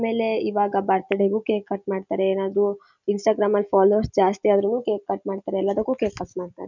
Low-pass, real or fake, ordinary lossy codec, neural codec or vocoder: 7.2 kHz; real; none; none